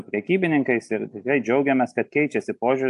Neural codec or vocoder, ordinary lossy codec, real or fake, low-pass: none; AAC, 64 kbps; real; 10.8 kHz